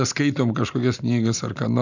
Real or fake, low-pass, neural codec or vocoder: real; 7.2 kHz; none